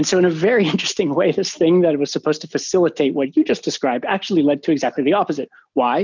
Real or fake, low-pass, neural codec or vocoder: real; 7.2 kHz; none